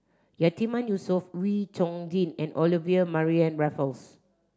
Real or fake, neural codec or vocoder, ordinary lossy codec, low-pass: real; none; none; none